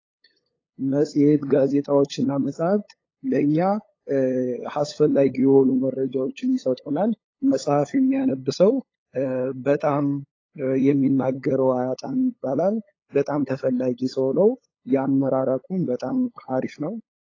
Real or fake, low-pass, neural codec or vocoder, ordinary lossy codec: fake; 7.2 kHz; codec, 16 kHz, 8 kbps, FunCodec, trained on LibriTTS, 25 frames a second; AAC, 32 kbps